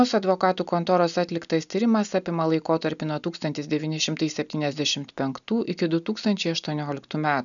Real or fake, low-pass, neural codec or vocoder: real; 7.2 kHz; none